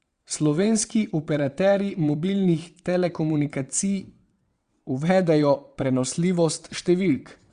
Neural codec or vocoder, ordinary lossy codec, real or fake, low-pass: vocoder, 22.05 kHz, 80 mel bands, WaveNeXt; Opus, 64 kbps; fake; 9.9 kHz